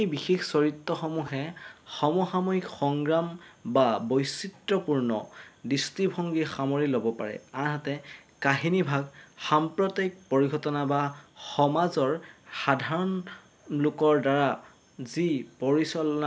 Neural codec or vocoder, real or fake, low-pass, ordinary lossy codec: none; real; none; none